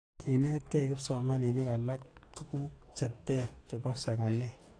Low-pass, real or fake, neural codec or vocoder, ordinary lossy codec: 9.9 kHz; fake; codec, 44.1 kHz, 2.6 kbps, DAC; AAC, 48 kbps